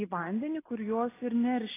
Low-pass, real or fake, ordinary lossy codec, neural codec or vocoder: 3.6 kHz; real; AAC, 16 kbps; none